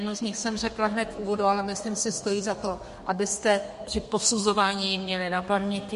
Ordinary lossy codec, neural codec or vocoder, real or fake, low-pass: MP3, 48 kbps; codec, 32 kHz, 1.9 kbps, SNAC; fake; 14.4 kHz